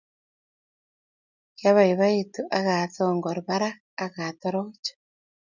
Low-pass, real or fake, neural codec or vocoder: 7.2 kHz; real; none